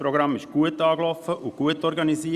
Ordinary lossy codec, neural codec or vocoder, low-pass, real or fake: Opus, 64 kbps; none; 14.4 kHz; real